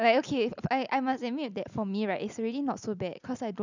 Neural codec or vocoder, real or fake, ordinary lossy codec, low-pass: none; real; none; 7.2 kHz